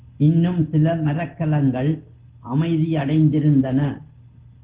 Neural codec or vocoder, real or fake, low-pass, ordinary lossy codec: none; real; 3.6 kHz; Opus, 16 kbps